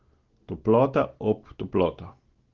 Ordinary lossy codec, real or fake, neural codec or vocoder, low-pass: Opus, 16 kbps; real; none; 7.2 kHz